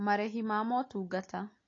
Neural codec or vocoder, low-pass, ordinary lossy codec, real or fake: none; 7.2 kHz; AAC, 32 kbps; real